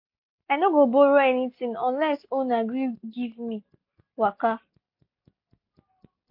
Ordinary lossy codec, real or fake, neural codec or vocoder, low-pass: MP3, 32 kbps; real; none; 5.4 kHz